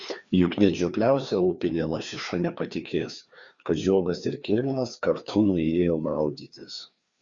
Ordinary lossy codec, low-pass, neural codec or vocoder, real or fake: Opus, 64 kbps; 7.2 kHz; codec, 16 kHz, 2 kbps, FreqCodec, larger model; fake